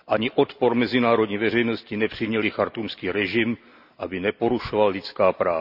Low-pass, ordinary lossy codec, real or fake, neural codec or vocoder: 5.4 kHz; none; real; none